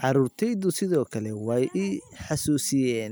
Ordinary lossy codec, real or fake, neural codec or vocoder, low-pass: none; real; none; none